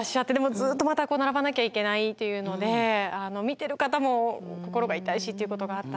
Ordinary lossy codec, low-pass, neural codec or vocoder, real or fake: none; none; none; real